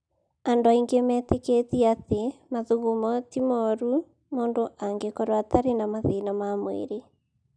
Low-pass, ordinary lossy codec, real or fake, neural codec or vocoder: 9.9 kHz; none; real; none